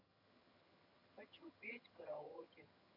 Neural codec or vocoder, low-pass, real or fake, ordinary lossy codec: vocoder, 22.05 kHz, 80 mel bands, HiFi-GAN; 5.4 kHz; fake; none